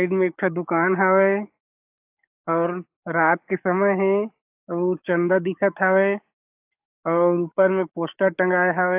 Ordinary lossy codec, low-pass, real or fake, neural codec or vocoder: AAC, 32 kbps; 3.6 kHz; fake; codec, 44.1 kHz, 7.8 kbps, DAC